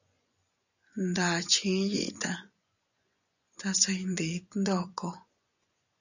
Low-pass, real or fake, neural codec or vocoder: 7.2 kHz; fake; vocoder, 24 kHz, 100 mel bands, Vocos